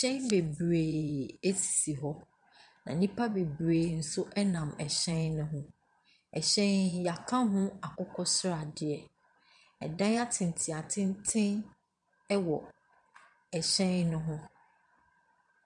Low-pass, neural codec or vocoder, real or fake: 9.9 kHz; vocoder, 22.05 kHz, 80 mel bands, Vocos; fake